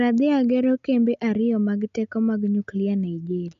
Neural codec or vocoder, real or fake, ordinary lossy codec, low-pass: none; real; none; 7.2 kHz